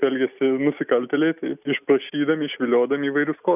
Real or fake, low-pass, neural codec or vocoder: real; 3.6 kHz; none